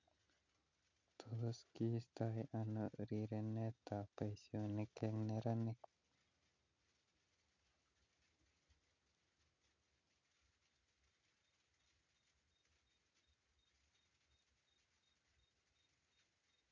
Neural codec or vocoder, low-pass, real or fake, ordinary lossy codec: none; 7.2 kHz; real; none